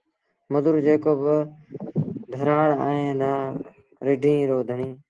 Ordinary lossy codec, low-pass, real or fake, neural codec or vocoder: Opus, 16 kbps; 9.9 kHz; real; none